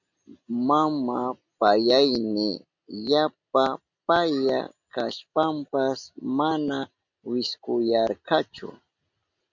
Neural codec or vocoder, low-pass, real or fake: none; 7.2 kHz; real